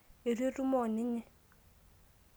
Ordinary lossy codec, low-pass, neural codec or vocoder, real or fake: none; none; none; real